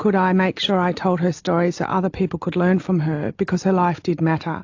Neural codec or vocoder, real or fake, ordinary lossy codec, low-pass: none; real; AAC, 48 kbps; 7.2 kHz